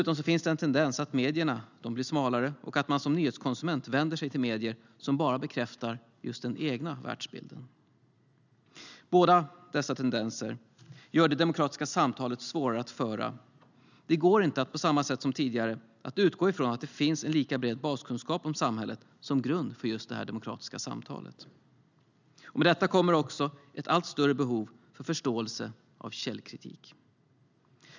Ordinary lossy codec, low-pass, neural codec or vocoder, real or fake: none; 7.2 kHz; none; real